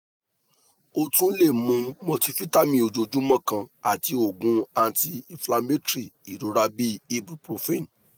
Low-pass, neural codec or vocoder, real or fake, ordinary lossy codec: none; none; real; none